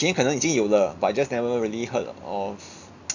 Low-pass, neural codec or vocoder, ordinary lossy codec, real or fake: 7.2 kHz; none; none; real